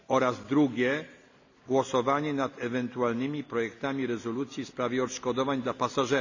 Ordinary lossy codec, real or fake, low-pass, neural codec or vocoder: none; real; 7.2 kHz; none